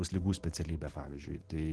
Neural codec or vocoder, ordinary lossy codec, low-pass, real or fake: none; Opus, 16 kbps; 10.8 kHz; real